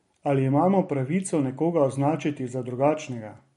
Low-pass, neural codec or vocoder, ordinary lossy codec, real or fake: 19.8 kHz; none; MP3, 48 kbps; real